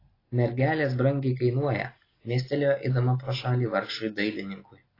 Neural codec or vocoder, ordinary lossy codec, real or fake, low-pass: none; AAC, 24 kbps; real; 5.4 kHz